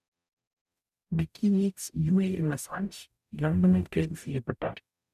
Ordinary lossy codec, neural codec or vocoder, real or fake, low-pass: none; codec, 44.1 kHz, 0.9 kbps, DAC; fake; 14.4 kHz